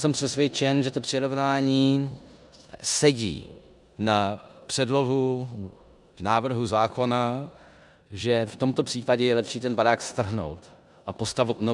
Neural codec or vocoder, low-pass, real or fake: codec, 16 kHz in and 24 kHz out, 0.9 kbps, LongCat-Audio-Codec, four codebook decoder; 10.8 kHz; fake